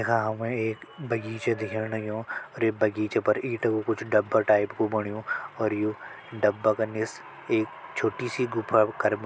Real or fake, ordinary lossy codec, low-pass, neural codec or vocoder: real; none; none; none